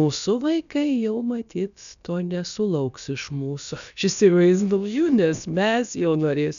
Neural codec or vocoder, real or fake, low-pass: codec, 16 kHz, about 1 kbps, DyCAST, with the encoder's durations; fake; 7.2 kHz